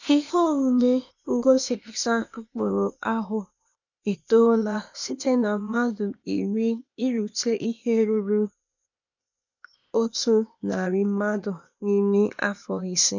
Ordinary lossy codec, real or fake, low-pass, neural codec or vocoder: none; fake; 7.2 kHz; codec, 16 kHz, 0.8 kbps, ZipCodec